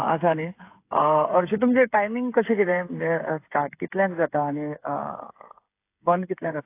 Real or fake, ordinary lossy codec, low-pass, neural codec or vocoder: fake; AAC, 24 kbps; 3.6 kHz; codec, 16 kHz, 8 kbps, FreqCodec, smaller model